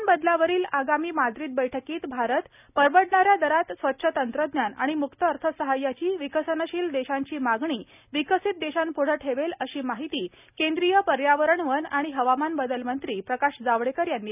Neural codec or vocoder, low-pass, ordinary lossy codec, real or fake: none; 3.6 kHz; none; real